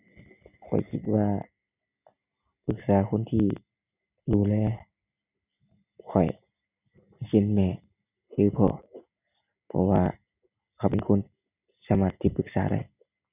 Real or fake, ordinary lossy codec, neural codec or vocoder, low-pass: real; none; none; 3.6 kHz